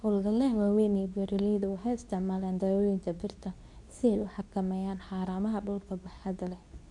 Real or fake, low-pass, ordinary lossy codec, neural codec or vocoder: fake; 10.8 kHz; none; codec, 24 kHz, 0.9 kbps, WavTokenizer, medium speech release version 2